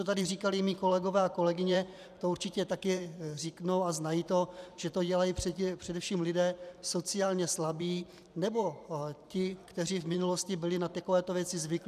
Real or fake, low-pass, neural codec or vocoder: fake; 14.4 kHz; vocoder, 44.1 kHz, 128 mel bands every 512 samples, BigVGAN v2